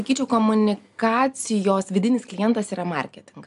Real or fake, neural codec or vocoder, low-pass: real; none; 10.8 kHz